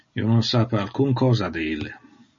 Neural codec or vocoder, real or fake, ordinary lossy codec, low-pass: none; real; MP3, 32 kbps; 7.2 kHz